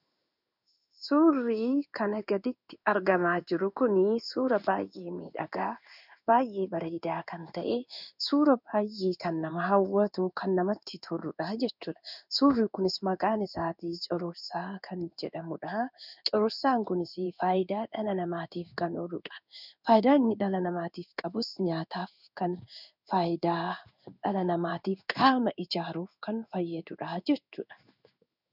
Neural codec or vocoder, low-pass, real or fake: codec, 16 kHz in and 24 kHz out, 1 kbps, XY-Tokenizer; 5.4 kHz; fake